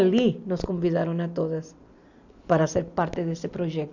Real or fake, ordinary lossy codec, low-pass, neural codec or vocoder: real; none; 7.2 kHz; none